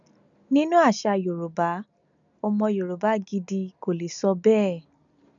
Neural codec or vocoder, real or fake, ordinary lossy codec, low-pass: none; real; none; 7.2 kHz